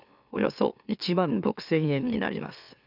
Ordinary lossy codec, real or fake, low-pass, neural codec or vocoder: none; fake; 5.4 kHz; autoencoder, 44.1 kHz, a latent of 192 numbers a frame, MeloTTS